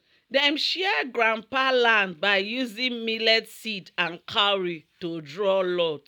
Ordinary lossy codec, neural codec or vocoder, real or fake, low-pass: none; none; real; 19.8 kHz